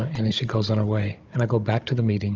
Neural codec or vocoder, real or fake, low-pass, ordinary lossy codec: codec, 16 kHz, 16 kbps, FunCodec, trained on Chinese and English, 50 frames a second; fake; 7.2 kHz; Opus, 24 kbps